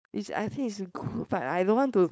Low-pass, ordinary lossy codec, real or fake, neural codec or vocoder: none; none; fake; codec, 16 kHz, 4.8 kbps, FACodec